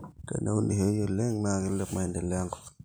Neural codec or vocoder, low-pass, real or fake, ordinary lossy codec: none; none; real; none